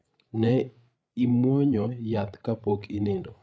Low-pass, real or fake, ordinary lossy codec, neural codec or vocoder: none; fake; none; codec, 16 kHz, 8 kbps, FreqCodec, larger model